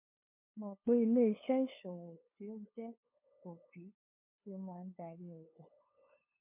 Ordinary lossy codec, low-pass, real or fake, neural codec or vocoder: MP3, 32 kbps; 3.6 kHz; fake; codec, 16 kHz, 2 kbps, FunCodec, trained on LibriTTS, 25 frames a second